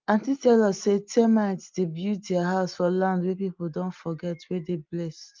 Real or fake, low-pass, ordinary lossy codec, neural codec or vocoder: real; 7.2 kHz; Opus, 24 kbps; none